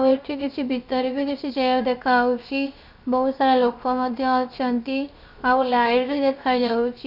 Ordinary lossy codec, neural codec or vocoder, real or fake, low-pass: none; codec, 16 kHz, 0.7 kbps, FocalCodec; fake; 5.4 kHz